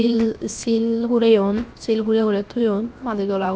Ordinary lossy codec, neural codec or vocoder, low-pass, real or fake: none; codec, 16 kHz, 0.7 kbps, FocalCodec; none; fake